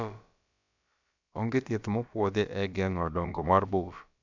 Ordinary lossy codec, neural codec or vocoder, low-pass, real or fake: none; codec, 16 kHz, about 1 kbps, DyCAST, with the encoder's durations; 7.2 kHz; fake